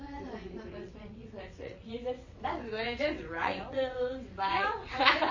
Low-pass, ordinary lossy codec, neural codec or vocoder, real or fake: 7.2 kHz; MP3, 32 kbps; vocoder, 22.05 kHz, 80 mel bands, WaveNeXt; fake